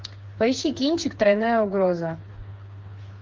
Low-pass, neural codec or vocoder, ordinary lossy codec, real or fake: 7.2 kHz; codec, 16 kHz, 4 kbps, FreqCodec, smaller model; Opus, 24 kbps; fake